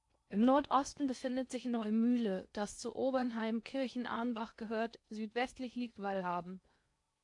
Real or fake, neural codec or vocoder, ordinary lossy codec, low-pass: fake; codec, 16 kHz in and 24 kHz out, 0.8 kbps, FocalCodec, streaming, 65536 codes; AAC, 64 kbps; 10.8 kHz